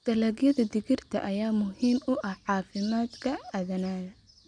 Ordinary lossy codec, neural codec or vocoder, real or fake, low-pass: none; none; real; 9.9 kHz